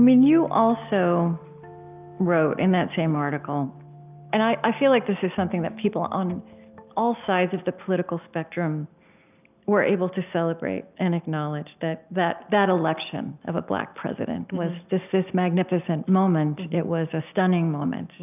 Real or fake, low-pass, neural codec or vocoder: real; 3.6 kHz; none